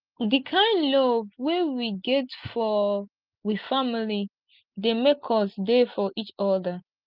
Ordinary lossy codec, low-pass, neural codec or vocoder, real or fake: Opus, 24 kbps; 5.4 kHz; none; real